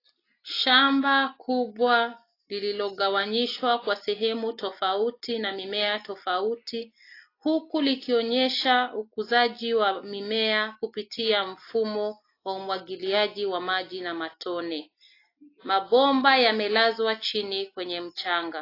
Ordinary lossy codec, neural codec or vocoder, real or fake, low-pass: AAC, 32 kbps; none; real; 5.4 kHz